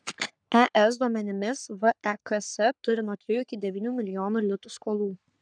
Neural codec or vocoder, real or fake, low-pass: codec, 44.1 kHz, 3.4 kbps, Pupu-Codec; fake; 9.9 kHz